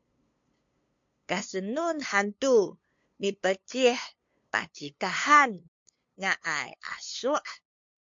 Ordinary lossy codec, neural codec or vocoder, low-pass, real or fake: MP3, 48 kbps; codec, 16 kHz, 2 kbps, FunCodec, trained on LibriTTS, 25 frames a second; 7.2 kHz; fake